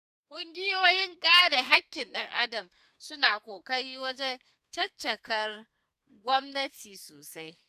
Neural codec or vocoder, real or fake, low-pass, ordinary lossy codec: codec, 32 kHz, 1.9 kbps, SNAC; fake; 14.4 kHz; none